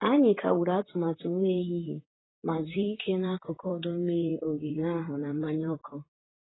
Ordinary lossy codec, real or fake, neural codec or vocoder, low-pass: AAC, 16 kbps; fake; vocoder, 44.1 kHz, 128 mel bands, Pupu-Vocoder; 7.2 kHz